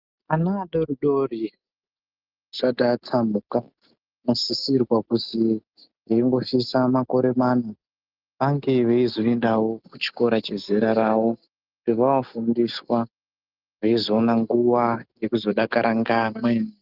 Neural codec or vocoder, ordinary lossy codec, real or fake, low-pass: none; Opus, 32 kbps; real; 5.4 kHz